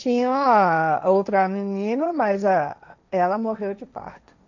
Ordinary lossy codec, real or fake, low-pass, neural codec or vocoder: none; fake; 7.2 kHz; codec, 16 kHz, 1.1 kbps, Voila-Tokenizer